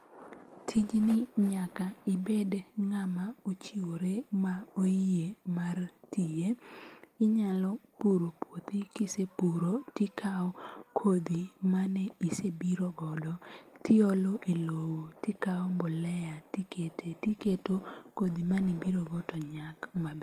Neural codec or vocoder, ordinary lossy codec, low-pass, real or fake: none; Opus, 32 kbps; 14.4 kHz; real